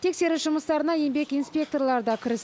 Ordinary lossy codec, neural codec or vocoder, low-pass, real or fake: none; none; none; real